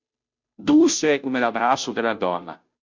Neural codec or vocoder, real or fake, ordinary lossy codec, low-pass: codec, 16 kHz, 0.5 kbps, FunCodec, trained on Chinese and English, 25 frames a second; fake; MP3, 64 kbps; 7.2 kHz